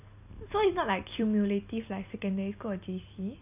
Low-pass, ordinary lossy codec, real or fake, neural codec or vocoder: 3.6 kHz; none; real; none